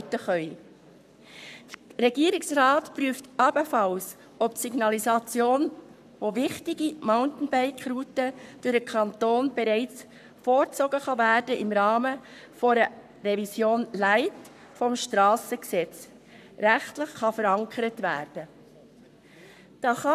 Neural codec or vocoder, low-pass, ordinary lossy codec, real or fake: codec, 44.1 kHz, 7.8 kbps, Pupu-Codec; 14.4 kHz; none; fake